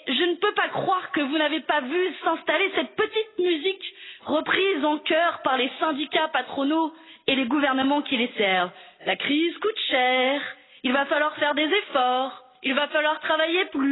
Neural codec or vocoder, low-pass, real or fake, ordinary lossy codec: none; 7.2 kHz; real; AAC, 16 kbps